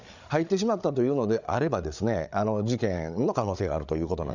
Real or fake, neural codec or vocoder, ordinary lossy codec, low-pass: fake; codec, 16 kHz, 8 kbps, FreqCodec, larger model; none; 7.2 kHz